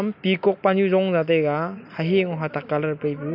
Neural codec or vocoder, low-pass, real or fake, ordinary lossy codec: none; 5.4 kHz; real; none